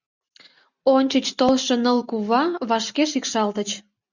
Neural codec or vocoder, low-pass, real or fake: none; 7.2 kHz; real